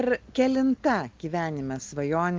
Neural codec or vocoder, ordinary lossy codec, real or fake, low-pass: none; Opus, 32 kbps; real; 7.2 kHz